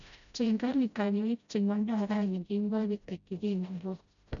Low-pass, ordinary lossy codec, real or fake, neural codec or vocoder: 7.2 kHz; none; fake; codec, 16 kHz, 0.5 kbps, FreqCodec, smaller model